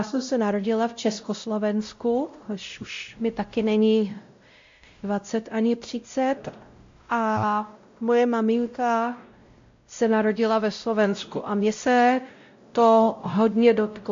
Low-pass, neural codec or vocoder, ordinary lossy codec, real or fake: 7.2 kHz; codec, 16 kHz, 0.5 kbps, X-Codec, WavLM features, trained on Multilingual LibriSpeech; MP3, 48 kbps; fake